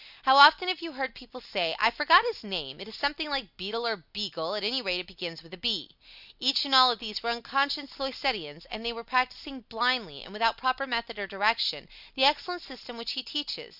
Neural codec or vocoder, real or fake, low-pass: none; real; 5.4 kHz